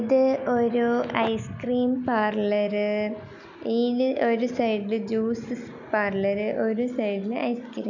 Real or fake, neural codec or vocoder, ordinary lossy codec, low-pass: real; none; none; 7.2 kHz